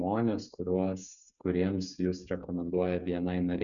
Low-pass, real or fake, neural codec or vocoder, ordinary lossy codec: 7.2 kHz; fake; codec, 16 kHz, 8 kbps, FreqCodec, smaller model; MP3, 96 kbps